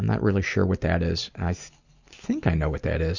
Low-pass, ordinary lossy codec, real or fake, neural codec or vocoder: 7.2 kHz; Opus, 64 kbps; real; none